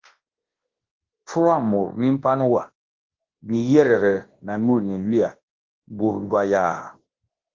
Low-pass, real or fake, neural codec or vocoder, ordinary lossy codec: 7.2 kHz; fake; codec, 24 kHz, 0.9 kbps, WavTokenizer, large speech release; Opus, 16 kbps